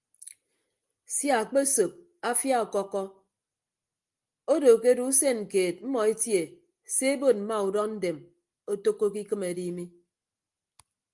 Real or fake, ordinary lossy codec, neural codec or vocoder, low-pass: real; Opus, 32 kbps; none; 10.8 kHz